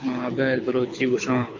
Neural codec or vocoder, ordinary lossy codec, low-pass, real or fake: codec, 24 kHz, 6 kbps, HILCodec; MP3, 48 kbps; 7.2 kHz; fake